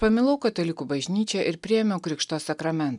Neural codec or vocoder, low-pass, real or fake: none; 10.8 kHz; real